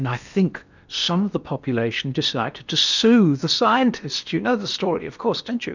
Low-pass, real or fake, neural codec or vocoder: 7.2 kHz; fake; codec, 16 kHz in and 24 kHz out, 0.8 kbps, FocalCodec, streaming, 65536 codes